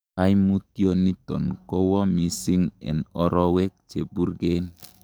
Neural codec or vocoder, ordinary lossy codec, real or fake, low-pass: codec, 44.1 kHz, 7.8 kbps, DAC; none; fake; none